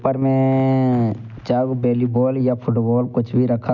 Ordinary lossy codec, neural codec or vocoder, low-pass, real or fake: none; none; 7.2 kHz; real